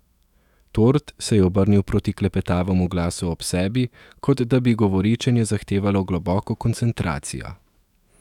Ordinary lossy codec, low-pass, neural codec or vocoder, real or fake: none; 19.8 kHz; autoencoder, 48 kHz, 128 numbers a frame, DAC-VAE, trained on Japanese speech; fake